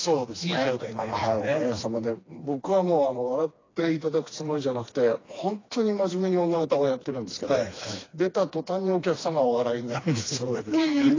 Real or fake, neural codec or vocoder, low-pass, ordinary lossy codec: fake; codec, 16 kHz, 2 kbps, FreqCodec, smaller model; 7.2 kHz; AAC, 32 kbps